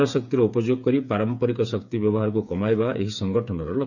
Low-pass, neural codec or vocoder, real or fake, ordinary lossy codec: 7.2 kHz; codec, 16 kHz, 8 kbps, FreqCodec, smaller model; fake; none